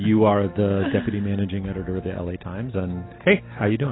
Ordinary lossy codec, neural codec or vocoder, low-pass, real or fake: AAC, 16 kbps; none; 7.2 kHz; real